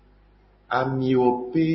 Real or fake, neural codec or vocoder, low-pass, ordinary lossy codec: real; none; 7.2 kHz; MP3, 24 kbps